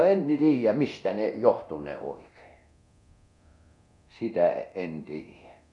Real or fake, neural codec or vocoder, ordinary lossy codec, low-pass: fake; codec, 24 kHz, 0.9 kbps, DualCodec; none; 10.8 kHz